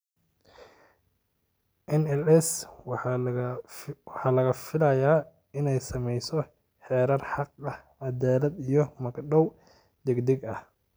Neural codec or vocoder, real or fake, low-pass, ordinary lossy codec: none; real; none; none